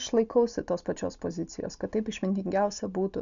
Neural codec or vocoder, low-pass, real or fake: none; 7.2 kHz; real